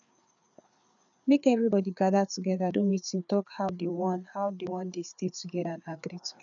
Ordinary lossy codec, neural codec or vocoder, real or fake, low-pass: none; codec, 16 kHz, 4 kbps, FreqCodec, larger model; fake; 7.2 kHz